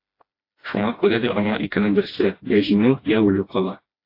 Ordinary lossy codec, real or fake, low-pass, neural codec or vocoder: AAC, 32 kbps; fake; 5.4 kHz; codec, 16 kHz, 1 kbps, FreqCodec, smaller model